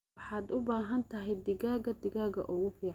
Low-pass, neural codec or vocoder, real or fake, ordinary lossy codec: 19.8 kHz; none; real; Opus, 32 kbps